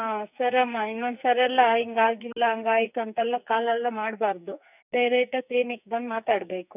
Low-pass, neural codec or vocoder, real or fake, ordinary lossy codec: 3.6 kHz; codec, 44.1 kHz, 2.6 kbps, SNAC; fake; none